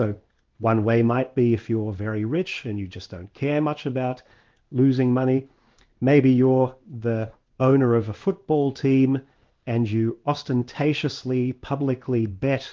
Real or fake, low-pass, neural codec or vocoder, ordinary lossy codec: fake; 7.2 kHz; codec, 16 kHz in and 24 kHz out, 1 kbps, XY-Tokenizer; Opus, 32 kbps